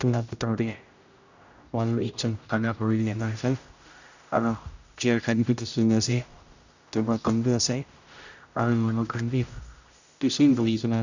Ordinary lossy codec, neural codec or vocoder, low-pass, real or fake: none; codec, 16 kHz, 0.5 kbps, X-Codec, HuBERT features, trained on general audio; 7.2 kHz; fake